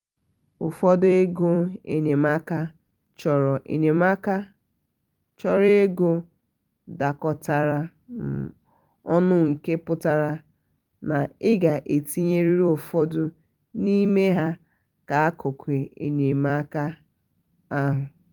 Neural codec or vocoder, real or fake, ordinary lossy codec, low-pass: vocoder, 44.1 kHz, 128 mel bands every 256 samples, BigVGAN v2; fake; Opus, 32 kbps; 19.8 kHz